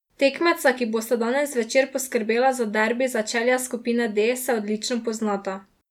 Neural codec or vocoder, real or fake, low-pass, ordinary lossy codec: none; real; 19.8 kHz; none